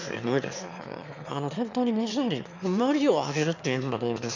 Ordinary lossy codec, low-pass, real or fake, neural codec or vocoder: none; 7.2 kHz; fake; autoencoder, 22.05 kHz, a latent of 192 numbers a frame, VITS, trained on one speaker